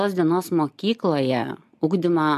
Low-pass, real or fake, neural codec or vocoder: 14.4 kHz; real; none